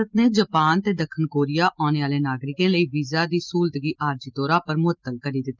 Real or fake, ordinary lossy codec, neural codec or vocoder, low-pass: real; Opus, 24 kbps; none; 7.2 kHz